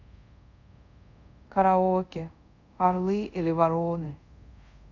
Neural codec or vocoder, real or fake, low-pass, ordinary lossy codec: codec, 24 kHz, 0.5 kbps, DualCodec; fake; 7.2 kHz; none